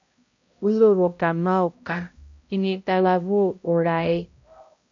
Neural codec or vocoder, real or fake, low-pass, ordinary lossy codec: codec, 16 kHz, 0.5 kbps, X-Codec, HuBERT features, trained on balanced general audio; fake; 7.2 kHz; AAC, 48 kbps